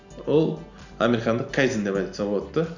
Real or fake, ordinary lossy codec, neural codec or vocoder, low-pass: real; none; none; 7.2 kHz